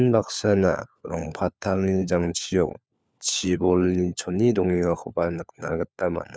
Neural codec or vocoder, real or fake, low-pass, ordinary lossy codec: codec, 16 kHz, 4 kbps, FunCodec, trained on LibriTTS, 50 frames a second; fake; none; none